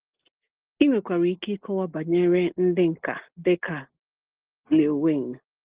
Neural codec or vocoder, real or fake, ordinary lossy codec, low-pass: none; real; Opus, 16 kbps; 3.6 kHz